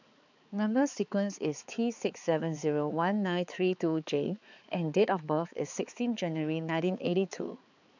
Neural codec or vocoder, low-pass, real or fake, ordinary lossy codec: codec, 16 kHz, 4 kbps, X-Codec, HuBERT features, trained on balanced general audio; 7.2 kHz; fake; none